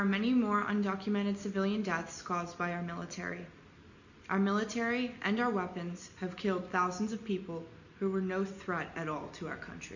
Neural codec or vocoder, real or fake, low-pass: none; real; 7.2 kHz